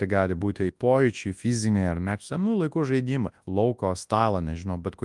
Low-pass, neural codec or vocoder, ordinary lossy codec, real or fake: 10.8 kHz; codec, 24 kHz, 0.9 kbps, WavTokenizer, large speech release; Opus, 32 kbps; fake